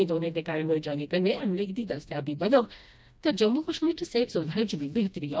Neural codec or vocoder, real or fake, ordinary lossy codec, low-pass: codec, 16 kHz, 1 kbps, FreqCodec, smaller model; fake; none; none